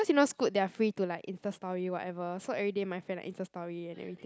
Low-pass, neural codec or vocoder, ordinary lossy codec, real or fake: none; none; none; real